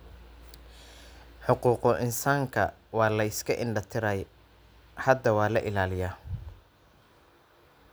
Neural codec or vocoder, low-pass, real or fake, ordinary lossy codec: none; none; real; none